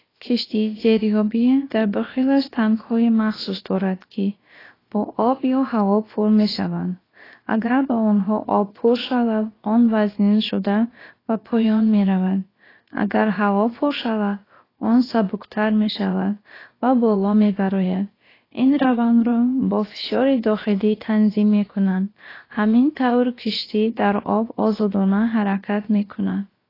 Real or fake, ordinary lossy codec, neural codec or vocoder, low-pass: fake; AAC, 24 kbps; codec, 16 kHz, about 1 kbps, DyCAST, with the encoder's durations; 5.4 kHz